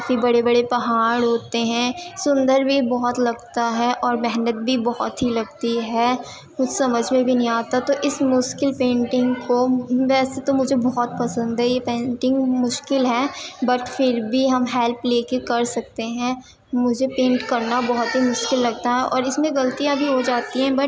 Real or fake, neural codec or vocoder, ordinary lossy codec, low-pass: real; none; none; none